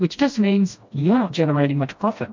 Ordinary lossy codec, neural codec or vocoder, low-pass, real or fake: MP3, 48 kbps; codec, 16 kHz, 1 kbps, FreqCodec, smaller model; 7.2 kHz; fake